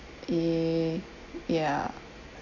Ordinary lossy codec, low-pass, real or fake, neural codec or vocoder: none; 7.2 kHz; real; none